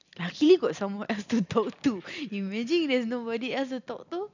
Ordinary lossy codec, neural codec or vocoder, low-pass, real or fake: none; none; 7.2 kHz; real